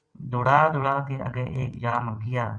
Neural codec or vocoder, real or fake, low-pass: vocoder, 22.05 kHz, 80 mel bands, WaveNeXt; fake; 9.9 kHz